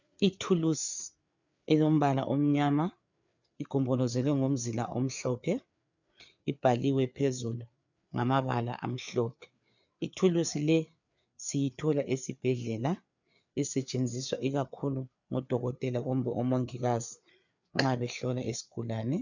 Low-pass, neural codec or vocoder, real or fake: 7.2 kHz; codec, 16 kHz, 4 kbps, FreqCodec, larger model; fake